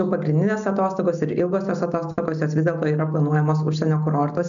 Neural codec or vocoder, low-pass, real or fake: none; 7.2 kHz; real